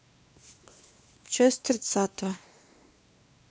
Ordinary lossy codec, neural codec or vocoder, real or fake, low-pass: none; codec, 16 kHz, 2 kbps, X-Codec, WavLM features, trained on Multilingual LibriSpeech; fake; none